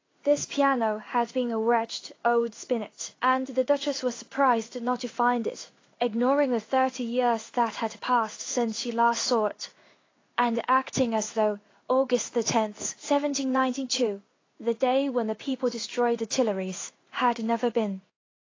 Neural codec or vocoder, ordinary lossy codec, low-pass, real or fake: codec, 16 kHz in and 24 kHz out, 1 kbps, XY-Tokenizer; AAC, 32 kbps; 7.2 kHz; fake